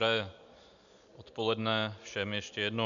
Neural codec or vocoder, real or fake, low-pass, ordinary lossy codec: none; real; 7.2 kHz; MP3, 96 kbps